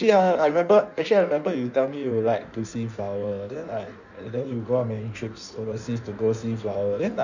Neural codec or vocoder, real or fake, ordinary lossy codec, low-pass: codec, 16 kHz in and 24 kHz out, 1.1 kbps, FireRedTTS-2 codec; fake; none; 7.2 kHz